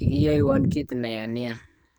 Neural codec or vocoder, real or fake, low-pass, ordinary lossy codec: codec, 44.1 kHz, 2.6 kbps, SNAC; fake; none; none